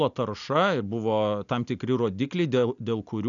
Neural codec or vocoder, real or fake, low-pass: none; real; 7.2 kHz